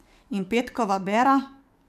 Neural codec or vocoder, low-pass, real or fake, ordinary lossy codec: codec, 44.1 kHz, 7.8 kbps, DAC; 14.4 kHz; fake; none